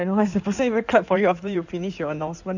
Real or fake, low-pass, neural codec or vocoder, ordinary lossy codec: fake; 7.2 kHz; codec, 16 kHz in and 24 kHz out, 2.2 kbps, FireRedTTS-2 codec; none